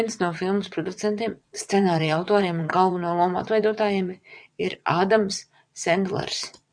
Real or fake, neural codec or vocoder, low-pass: fake; vocoder, 22.05 kHz, 80 mel bands, WaveNeXt; 9.9 kHz